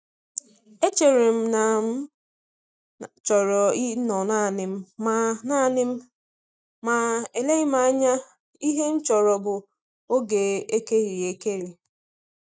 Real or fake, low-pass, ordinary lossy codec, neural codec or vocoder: real; none; none; none